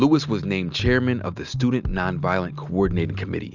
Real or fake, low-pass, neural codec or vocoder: real; 7.2 kHz; none